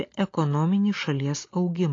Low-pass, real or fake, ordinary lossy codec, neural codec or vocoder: 7.2 kHz; real; AAC, 32 kbps; none